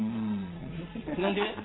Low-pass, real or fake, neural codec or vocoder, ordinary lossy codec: 7.2 kHz; fake; vocoder, 22.05 kHz, 80 mel bands, Vocos; AAC, 16 kbps